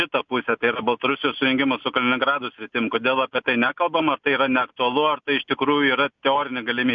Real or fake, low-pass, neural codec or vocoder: real; 7.2 kHz; none